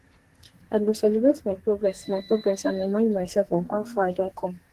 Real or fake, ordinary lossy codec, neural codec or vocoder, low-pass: fake; Opus, 16 kbps; codec, 44.1 kHz, 2.6 kbps, SNAC; 14.4 kHz